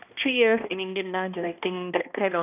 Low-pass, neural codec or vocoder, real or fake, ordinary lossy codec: 3.6 kHz; codec, 16 kHz, 1 kbps, X-Codec, HuBERT features, trained on balanced general audio; fake; none